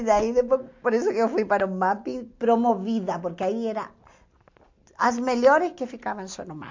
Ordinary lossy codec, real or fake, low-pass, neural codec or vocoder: MP3, 48 kbps; real; 7.2 kHz; none